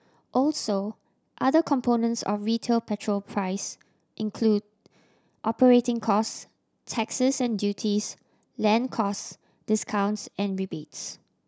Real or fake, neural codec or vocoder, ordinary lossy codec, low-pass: real; none; none; none